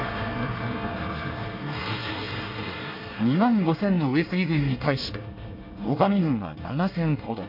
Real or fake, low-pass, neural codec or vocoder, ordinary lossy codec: fake; 5.4 kHz; codec, 24 kHz, 1 kbps, SNAC; MP3, 48 kbps